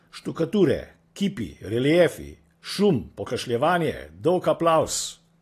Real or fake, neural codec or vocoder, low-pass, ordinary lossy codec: real; none; 14.4 kHz; AAC, 48 kbps